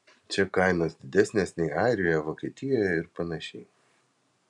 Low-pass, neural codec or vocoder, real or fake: 10.8 kHz; vocoder, 44.1 kHz, 128 mel bands every 512 samples, BigVGAN v2; fake